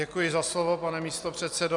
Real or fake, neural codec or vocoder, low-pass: real; none; 10.8 kHz